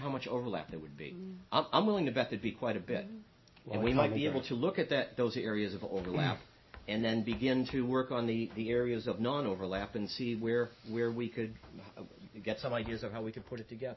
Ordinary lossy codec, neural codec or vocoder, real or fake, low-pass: MP3, 24 kbps; none; real; 7.2 kHz